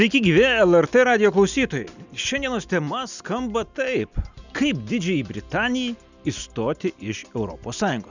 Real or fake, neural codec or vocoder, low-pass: real; none; 7.2 kHz